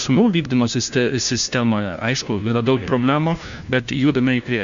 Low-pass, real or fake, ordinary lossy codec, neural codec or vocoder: 7.2 kHz; fake; Opus, 64 kbps; codec, 16 kHz, 1 kbps, FunCodec, trained on LibriTTS, 50 frames a second